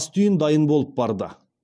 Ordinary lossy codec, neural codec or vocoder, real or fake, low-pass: none; none; real; none